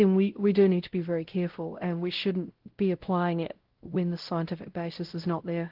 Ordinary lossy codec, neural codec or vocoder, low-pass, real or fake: Opus, 16 kbps; codec, 16 kHz, 0.5 kbps, X-Codec, WavLM features, trained on Multilingual LibriSpeech; 5.4 kHz; fake